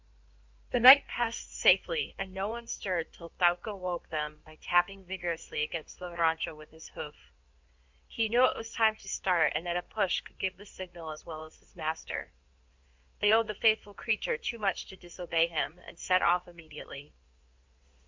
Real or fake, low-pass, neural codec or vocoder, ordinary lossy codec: fake; 7.2 kHz; codec, 16 kHz in and 24 kHz out, 2.2 kbps, FireRedTTS-2 codec; MP3, 64 kbps